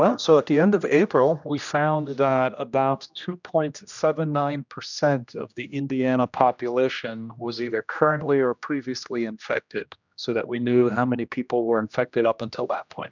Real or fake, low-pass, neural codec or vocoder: fake; 7.2 kHz; codec, 16 kHz, 1 kbps, X-Codec, HuBERT features, trained on general audio